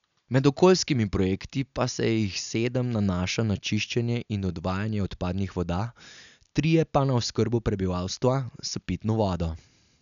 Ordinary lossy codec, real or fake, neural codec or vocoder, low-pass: none; real; none; 7.2 kHz